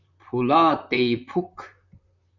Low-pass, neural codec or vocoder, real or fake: 7.2 kHz; vocoder, 44.1 kHz, 128 mel bands, Pupu-Vocoder; fake